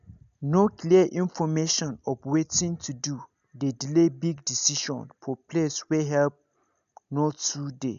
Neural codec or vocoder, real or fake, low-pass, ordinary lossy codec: none; real; 7.2 kHz; none